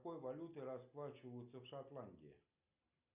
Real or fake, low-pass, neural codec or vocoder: real; 3.6 kHz; none